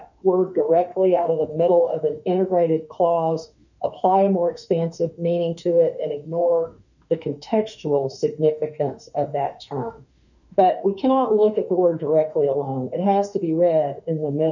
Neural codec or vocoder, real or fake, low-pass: autoencoder, 48 kHz, 32 numbers a frame, DAC-VAE, trained on Japanese speech; fake; 7.2 kHz